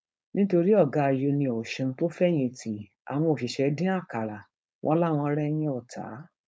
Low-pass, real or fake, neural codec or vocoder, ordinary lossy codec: none; fake; codec, 16 kHz, 4.8 kbps, FACodec; none